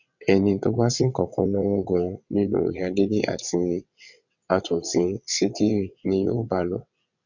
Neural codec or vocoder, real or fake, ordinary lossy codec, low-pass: vocoder, 22.05 kHz, 80 mel bands, WaveNeXt; fake; none; 7.2 kHz